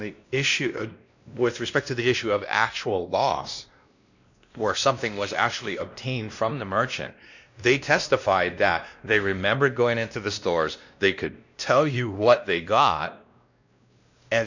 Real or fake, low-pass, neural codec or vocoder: fake; 7.2 kHz; codec, 16 kHz, 1 kbps, X-Codec, WavLM features, trained on Multilingual LibriSpeech